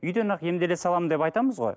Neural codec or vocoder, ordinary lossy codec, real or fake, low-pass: none; none; real; none